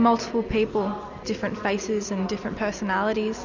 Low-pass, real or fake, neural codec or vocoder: 7.2 kHz; real; none